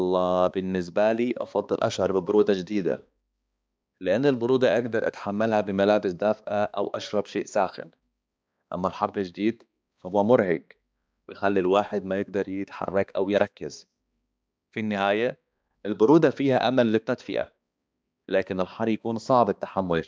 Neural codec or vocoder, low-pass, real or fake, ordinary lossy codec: codec, 16 kHz, 2 kbps, X-Codec, HuBERT features, trained on balanced general audio; none; fake; none